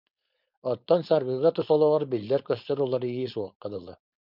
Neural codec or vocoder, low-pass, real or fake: codec, 16 kHz, 4.8 kbps, FACodec; 5.4 kHz; fake